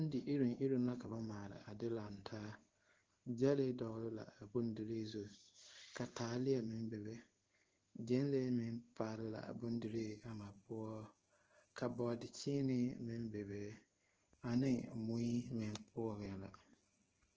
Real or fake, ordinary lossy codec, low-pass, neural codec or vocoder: fake; Opus, 16 kbps; 7.2 kHz; codec, 16 kHz in and 24 kHz out, 1 kbps, XY-Tokenizer